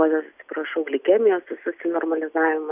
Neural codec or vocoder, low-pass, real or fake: none; 3.6 kHz; real